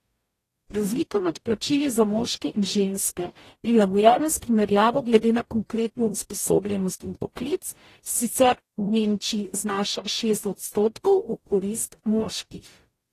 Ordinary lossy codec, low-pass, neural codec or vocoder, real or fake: AAC, 48 kbps; 14.4 kHz; codec, 44.1 kHz, 0.9 kbps, DAC; fake